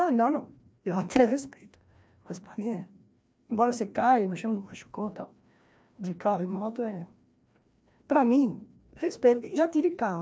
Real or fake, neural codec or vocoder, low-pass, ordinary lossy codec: fake; codec, 16 kHz, 1 kbps, FreqCodec, larger model; none; none